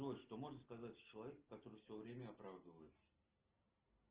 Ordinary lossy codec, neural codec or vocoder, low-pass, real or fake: Opus, 16 kbps; none; 3.6 kHz; real